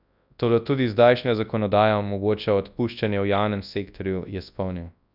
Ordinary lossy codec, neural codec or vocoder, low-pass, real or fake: none; codec, 24 kHz, 0.9 kbps, WavTokenizer, large speech release; 5.4 kHz; fake